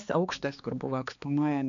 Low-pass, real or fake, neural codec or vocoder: 7.2 kHz; fake; codec, 16 kHz, 2 kbps, X-Codec, HuBERT features, trained on balanced general audio